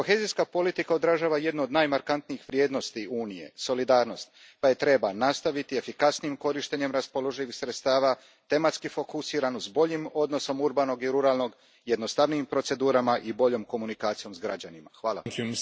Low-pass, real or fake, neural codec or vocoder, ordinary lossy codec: none; real; none; none